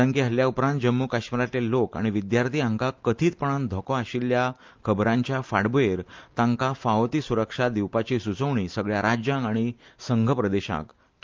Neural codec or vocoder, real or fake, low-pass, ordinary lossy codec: none; real; 7.2 kHz; Opus, 24 kbps